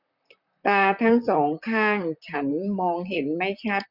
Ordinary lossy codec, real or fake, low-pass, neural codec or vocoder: none; fake; 5.4 kHz; vocoder, 44.1 kHz, 128 mel bands, Pupu-Vocoder